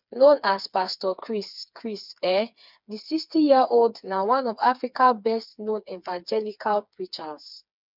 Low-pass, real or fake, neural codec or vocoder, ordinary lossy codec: 5.4 kHz; fake; codec, 16 kHz, 4 kbps, FreqCodec, smaller model; none